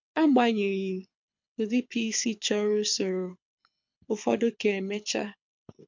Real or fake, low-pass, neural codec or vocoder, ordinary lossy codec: fake; 7.2 kHz; codec, 24 kHz, 6 kbps, HILCodec; MP3, 64 kbps